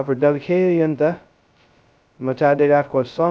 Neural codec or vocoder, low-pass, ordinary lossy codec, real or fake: codec, 16 kHz, 0.2 kbps, FocalCodec; none; none; fake